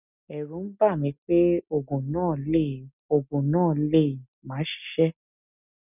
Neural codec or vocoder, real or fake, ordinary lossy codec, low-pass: none; real; none; 3.6 kHz